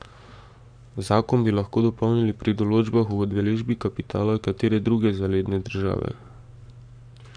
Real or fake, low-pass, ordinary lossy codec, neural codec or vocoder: fake; 9.9 kHz; none; codec, 44.1 kHz, 7.8 kbps, Pupu-Codec